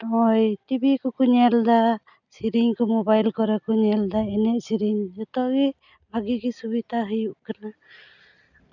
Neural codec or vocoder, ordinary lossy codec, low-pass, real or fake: none; none; 7.2 kHz; real